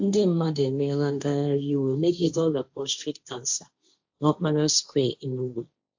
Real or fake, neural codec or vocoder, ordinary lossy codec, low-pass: fake; codec, 16 kHz, 1.1 kbps, Voila-Tokenizer; AAC, 48 kbps; 7.2 kHz